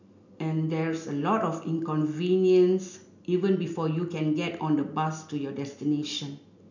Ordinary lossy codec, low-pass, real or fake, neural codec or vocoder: none; 7.2 kHz; real; none